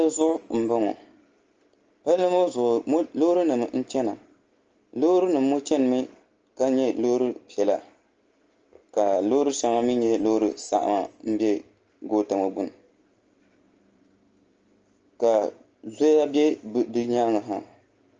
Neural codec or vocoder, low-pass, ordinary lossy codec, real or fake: none; 7.2 kHz; Opus, 24 kbps; real